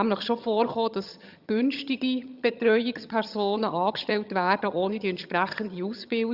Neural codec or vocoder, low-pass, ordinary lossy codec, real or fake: vocoder, 22.05 kHz, 80 mel bands, HiFi-GAN; 5.4 kHz; Opus, 64 kbps; fake